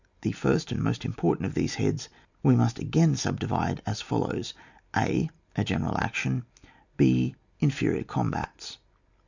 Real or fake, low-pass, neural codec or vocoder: real; 7.2 kHz; none